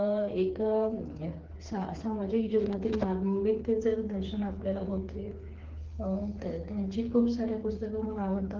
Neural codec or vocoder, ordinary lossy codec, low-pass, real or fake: codec, 16 kHz, 4 kbps, FreqCodec, smaller model; Opus, 16 kbps; 7.2 kHz; fake